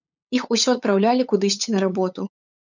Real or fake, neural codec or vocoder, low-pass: fake; codec, 16 kHz, 8 kbps, FunCodec, trained on LibriTTS, 25 frames a second; 7.2 kHz